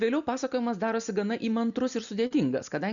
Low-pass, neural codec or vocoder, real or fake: 7.2 kHz; none; real